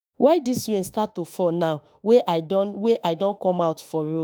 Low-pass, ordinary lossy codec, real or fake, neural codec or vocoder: none; none; fake; autoencoder, 48 kHz, 32 numbers a frame, DAC-VAE, trained on Japanese speech